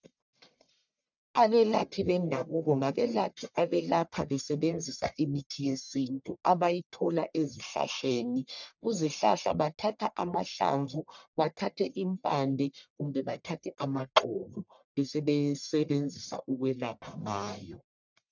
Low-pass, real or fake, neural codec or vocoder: 7.2 kHz; fake; codec, 44.1 kHz, 1.7 kbps, Pupu-Codec